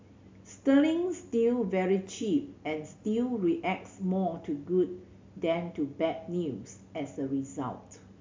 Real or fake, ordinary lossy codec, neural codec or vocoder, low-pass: real; MP3, 64 kbps; none; 7.2 kHz